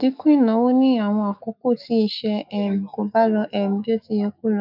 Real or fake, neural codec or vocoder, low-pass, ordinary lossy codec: fake; autoencoder, 48 kHz, 128 numbers a frame, DAC-VAE, trained on Japanese speech; 5.4 kHz; none